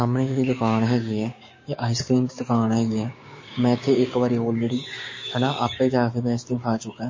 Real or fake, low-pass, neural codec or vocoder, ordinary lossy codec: fake; 7.2 kHz; codec, 16 kHz, 6 kbps, DAC; MP3, 32 kbps